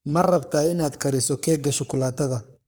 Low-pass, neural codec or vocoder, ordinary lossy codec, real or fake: none; codec, 44.1 kHz, 3.4 kbps, Pupu-Codec; none; fake